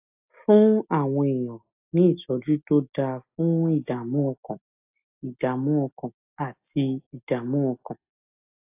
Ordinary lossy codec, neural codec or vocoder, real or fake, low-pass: AAC, 32 kbps; none; real; 3.6 kHz